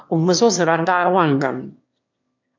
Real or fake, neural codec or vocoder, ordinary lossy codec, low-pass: fake; autoencoder, 22.05 kHz, a latent of 192 numbers a frame, VITS, trained on one speaker; MP3, 48 kbps; 7.2 kHz